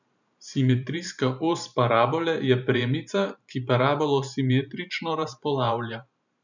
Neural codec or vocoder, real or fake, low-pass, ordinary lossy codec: vocoder, 44.1 kHz, 128 mel bands every 512 samples, BigVGAN v2; fake; 7.2 kHz; none